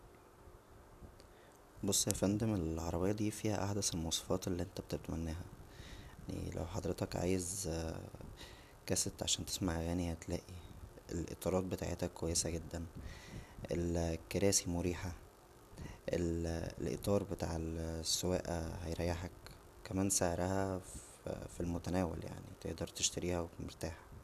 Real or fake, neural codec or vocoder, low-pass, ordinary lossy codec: real; none; 14.4 kHz; none